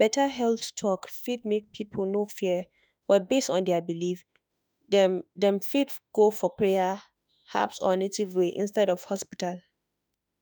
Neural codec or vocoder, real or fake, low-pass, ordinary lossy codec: autoencoder, 48 kHz, 32 numbers a frame, DAC-VAE, trained on Japanese speech; fake; none; none